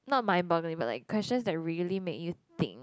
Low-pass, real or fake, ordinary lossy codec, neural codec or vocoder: none; real; none; none